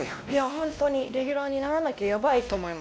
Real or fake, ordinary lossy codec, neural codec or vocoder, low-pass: fake; none; codec, 16 kHz, 1 kbps, X-Codec, WavLM features, trained on Multilingual LibriSpeech; none